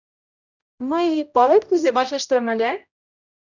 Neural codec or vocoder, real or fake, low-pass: codec, 16 kHz, 0.5 kbps, X-Codec, HuBERT features, trained on general audio; fake; 7.2 kHz